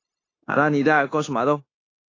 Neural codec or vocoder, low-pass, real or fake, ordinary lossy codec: codec, 16 kHz, 0.9 kbps, LongCat-Audio-Codec; 7.2 kHz; fake; AAC, 32 kbps